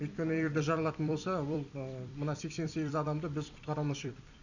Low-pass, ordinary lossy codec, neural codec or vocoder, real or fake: 7.2 kHz; none; vocoder, 44.1 kHz, 128 mel bands every 256 samples, BigVGAN v2; fake